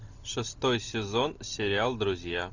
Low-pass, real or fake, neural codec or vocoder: 7.2 kHz; real; none